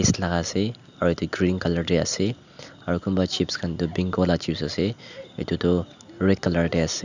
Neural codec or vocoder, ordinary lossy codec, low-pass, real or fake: none; none; 7.2 kHz; real